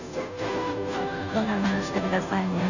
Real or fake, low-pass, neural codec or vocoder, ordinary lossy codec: fake; 7.2 kHz; codec, 16 kHz, 0.5 kbps, FunCodec, trained on Chinese and English, 25 frames a second; none